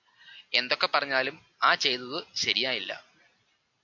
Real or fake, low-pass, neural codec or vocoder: real; 7.2 kHz; none